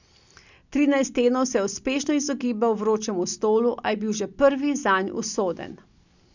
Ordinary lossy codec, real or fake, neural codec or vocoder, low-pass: none; real; none; 7.2 kHz